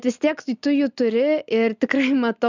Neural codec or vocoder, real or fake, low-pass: none; real; 7.2 kHz